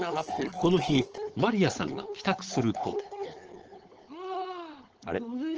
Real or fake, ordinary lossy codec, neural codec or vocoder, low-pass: fake; Opus, 16 kbps; codec, 16 kHz, 4.8 kbps, FACodec; 7.2 kHz